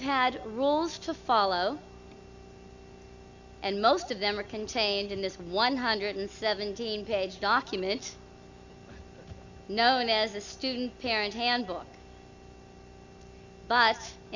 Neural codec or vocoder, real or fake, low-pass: none; real; 7.2 kHz